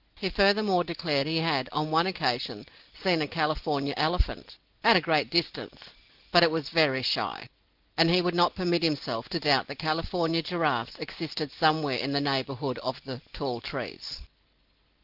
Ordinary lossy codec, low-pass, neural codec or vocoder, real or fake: Opus, 16 kbps; 5.4 kHz; none; real